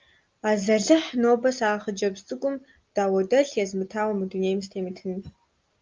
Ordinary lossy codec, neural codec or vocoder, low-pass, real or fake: Opus, 24 kbps; none; 7.2 kHz; real